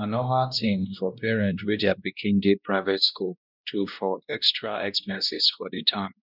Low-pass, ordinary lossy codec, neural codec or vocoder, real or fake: 5.4 kHz; none; codec, 16 kHz, 1 kbps, X-Codec, WavLM features, trained on Multilingual LibriSpeech; fake